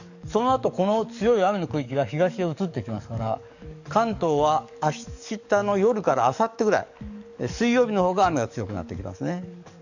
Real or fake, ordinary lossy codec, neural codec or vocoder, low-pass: fake; none; codec, 44.1 kHz, 7.8 kbps, DAC; 7.2 kHz